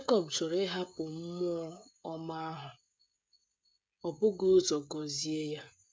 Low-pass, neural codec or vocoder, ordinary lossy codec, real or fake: none; codec, 16 kHz, 16 kbps, FreqCodec, smaller model; none; fake